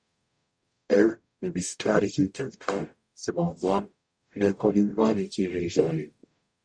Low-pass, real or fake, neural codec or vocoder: 9.9 kHz; fake; codec, 44.1 kHz, 0.9 kbps, DAC